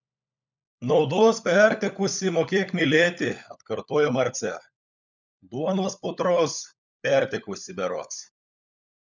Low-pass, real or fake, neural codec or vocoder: 7.2 kHz; fake; codec, 16 kHz, 16 kbps, FunCodec, trained on LibriTTS, 50 frames a second